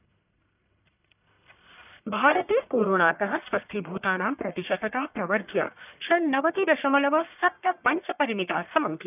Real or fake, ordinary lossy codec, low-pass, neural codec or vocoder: fake; none; 3.6 kHz; codec, 44.1 kHz, 1.7 kbps, Pupu-Codec